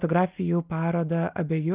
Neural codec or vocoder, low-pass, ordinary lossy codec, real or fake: none; 3.6 kHz; Opus, 32 kbps; real